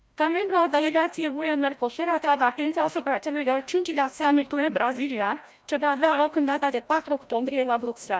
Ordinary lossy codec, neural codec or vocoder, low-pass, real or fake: none; codec, 16 kHz, 0.5 kbps, FreqCodec, larger model; none; fake